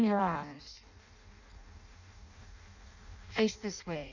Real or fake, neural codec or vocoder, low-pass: fake; codec, 16 kHz in and 24 kHz out, 0.6 kbps, FireRedTTS-2 codec; 7.2 kHz